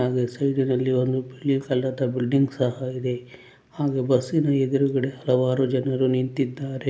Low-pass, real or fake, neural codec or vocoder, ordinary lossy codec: none; real; none; none